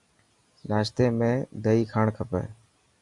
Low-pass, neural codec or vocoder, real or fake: 10.8 kHz; none; real